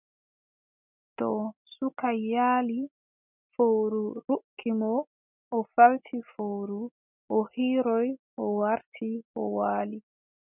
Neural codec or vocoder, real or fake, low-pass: none; real; 3.6 kHz